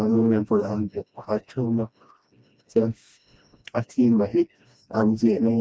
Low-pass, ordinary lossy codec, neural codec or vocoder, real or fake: none; none; codec, 16 kHz, 1 kbps, FreqCodec, smaller model; fake